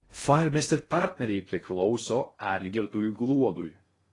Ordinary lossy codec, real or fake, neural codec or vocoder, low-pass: AAC, 32 kbps; fake; codec, 16 kHz in and 24 kHz out, 0.6 kbps, FocalCodec, streaming, 2048 codes; 10.8 kHz